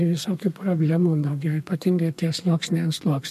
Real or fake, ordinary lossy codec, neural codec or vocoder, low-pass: fake; MP3, 64 kbps; codec, 32 kHz, 1.9 kbps, SNAC; 14.4 kHz